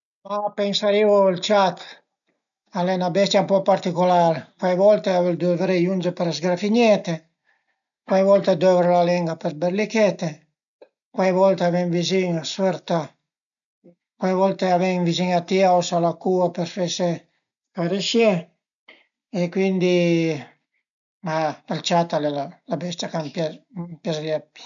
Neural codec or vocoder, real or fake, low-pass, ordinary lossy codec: none; real; 7.2 kHz; none